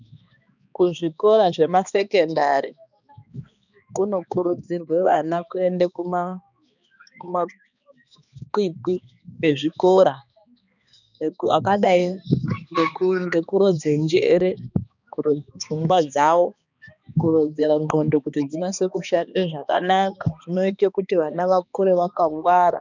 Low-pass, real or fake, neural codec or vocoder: 7.2 kHz; fake; codec, 16 kHz, 2 kbps, X-Codec, HuBERT features, trained on balanced general audio